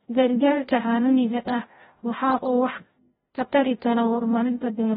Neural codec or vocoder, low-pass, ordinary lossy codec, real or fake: codec, 16 kHz, 0.5 kbps, FreqCodec, larger model; 7.2 kHz; AAC, 16 kbps; fake